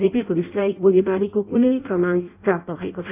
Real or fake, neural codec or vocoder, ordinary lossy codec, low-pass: fake; codec, 16 kHz in and 24 kHz out, 0.6 kbps, FireRedTTS-2 codec; none; 3.6 kHz